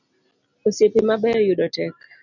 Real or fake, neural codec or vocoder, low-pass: real; none; 7.2 kHz